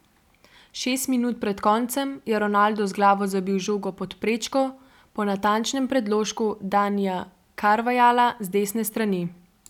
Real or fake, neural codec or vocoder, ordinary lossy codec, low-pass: real; none; none; 19.8 kHz